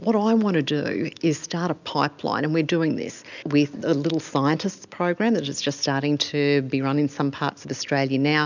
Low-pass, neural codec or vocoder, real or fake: 7.2 kHz; none; real